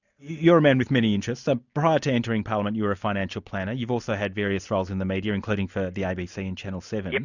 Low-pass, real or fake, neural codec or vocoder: 7.2 kHz; real; none